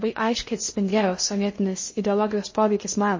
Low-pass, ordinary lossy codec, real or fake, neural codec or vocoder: 7.2 kHz; MP3, 32 kbps; fake; codec, 16 kHz in and 24 kHz out, 0.6 kbps, FocalCodec, streaming, 2048 codes